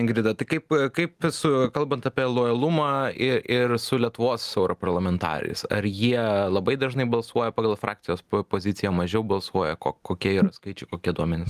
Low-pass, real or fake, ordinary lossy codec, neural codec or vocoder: 14.4 kHz; real; Opus, 32 kbps; none